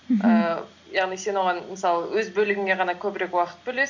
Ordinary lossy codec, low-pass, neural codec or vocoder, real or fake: MP3, 64 kbps; 7.2 kHz; none; real